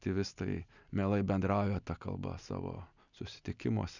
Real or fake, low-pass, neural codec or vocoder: real; 7.2 kHz; none